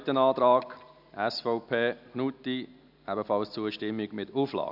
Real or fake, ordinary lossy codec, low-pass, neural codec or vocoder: real; none; 5.4 kHz; none